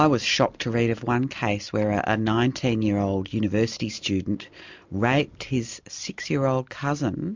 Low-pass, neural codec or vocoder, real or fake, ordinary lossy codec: 7.2 kHz; none; real; MP3, 64 kbps